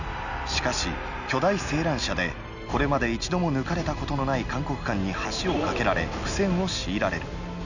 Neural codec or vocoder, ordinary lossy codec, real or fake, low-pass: none; none; real; 7.2 kHz